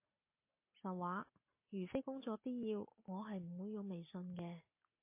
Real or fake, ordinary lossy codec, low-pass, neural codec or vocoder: real; MP3, 24 kbps; 3.6 kHz; none